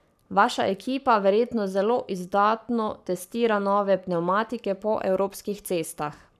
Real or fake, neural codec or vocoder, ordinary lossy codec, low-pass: fake; codec, 44.1 kHz, 7.8 kbps, Pupu-Codec; none; 14.4 kHz